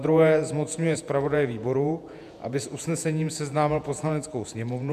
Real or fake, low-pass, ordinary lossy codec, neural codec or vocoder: fake; 14.4 kHz; AAC, 96 kbps; vocoder, 48 kHz, 128 mel bands, Vocos